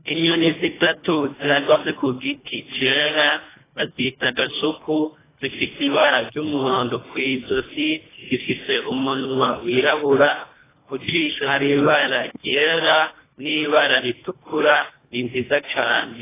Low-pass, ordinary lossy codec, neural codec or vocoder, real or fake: 3.6 kHz; AAC, 16 kbps; codec, 24 kHz, 1.5 kbps, HILCodec; fake